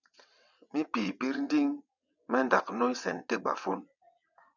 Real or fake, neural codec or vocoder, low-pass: fake; vocoder, 22.05 kHz, 80 mel bands, WaveNeXt; 7.2 kHz